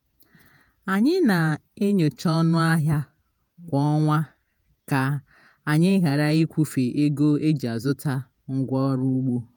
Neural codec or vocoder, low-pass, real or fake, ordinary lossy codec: vocoder, 48 kHz, 128 mel bands, Vocos; none; fake; none